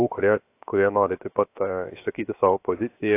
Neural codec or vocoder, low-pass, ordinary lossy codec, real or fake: codec, 16 kHz, 0.7 kbps, FocalCodec; 3.6 kHz; AAC, 24 kbps; fake